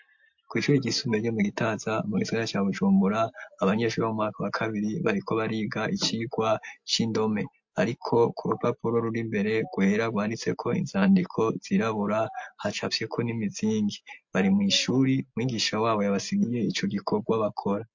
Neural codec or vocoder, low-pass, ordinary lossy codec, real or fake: none; 7.2 kHz; MP3, 48 kbps; real